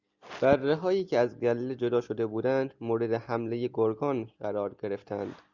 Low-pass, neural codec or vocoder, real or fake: 7.2 kHz; none; real